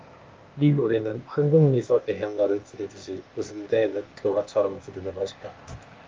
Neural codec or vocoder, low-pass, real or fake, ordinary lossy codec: codec, 16 kHz, 0.8 kbps, ZipCodec; 7.2 kHz; fake; Opus, 24 kbps